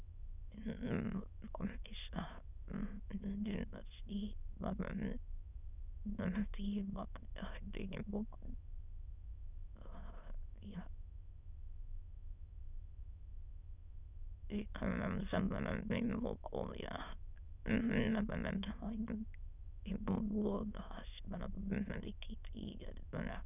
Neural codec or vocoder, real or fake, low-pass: autoencoder, 22.05 kHz, a latent of 192 numbers a frame, VITS, trained on many speakers; fake; 3.6 kHz